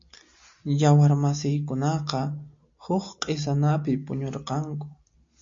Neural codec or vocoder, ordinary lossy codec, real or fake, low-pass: none; MP3, 48 kbps; real; 7.2 kHz